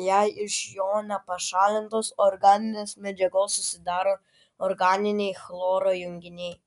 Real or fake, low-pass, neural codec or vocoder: real; 10.8 kHz; none